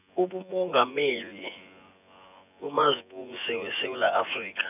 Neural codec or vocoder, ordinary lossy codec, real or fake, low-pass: vocoder, 24 kHz, 100 mel bands, Vocos; none; fake; 3.6 kHz